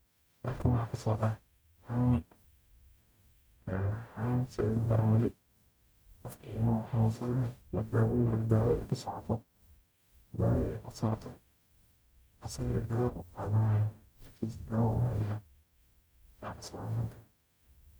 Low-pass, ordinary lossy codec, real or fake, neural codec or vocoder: none; none; fake; codec, 44.1 kHz, 0.9 kbps, DAC